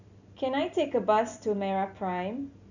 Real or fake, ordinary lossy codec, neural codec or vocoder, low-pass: real; none; none; 7.2 kHz